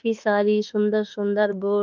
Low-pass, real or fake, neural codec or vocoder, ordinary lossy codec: 7.2 kHz; fake; autoencoder, 48 kHz, 32 numbers a frame, DAC-VAE, trained on Japanese speech; Opus, 24 kbps